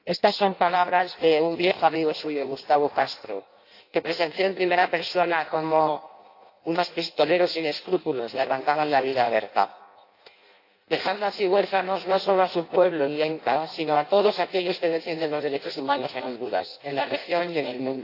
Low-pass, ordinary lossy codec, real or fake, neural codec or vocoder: 5.4 kHz; AAC, 32 kbps; fake; codec, 16 kHz in and 24 kHz out, 0.6 kbps, FireRedTTS-2 codec